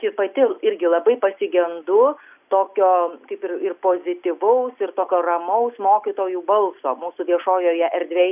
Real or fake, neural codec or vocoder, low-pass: real; none; 3.6 kHz